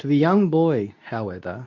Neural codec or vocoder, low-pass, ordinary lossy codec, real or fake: codec, 24 kHz, 0.9 kbps, WavTokenizer, medium speech release version 2; 7.2 kHz; MP3, 64 kbps; fake